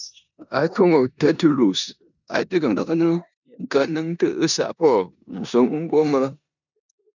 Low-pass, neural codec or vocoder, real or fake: 7.2 kHz; codec, 16 kHz in and 24 kHz out, 0.9 kbps, LongCat-Audio-Codec, four codebook decoder; fake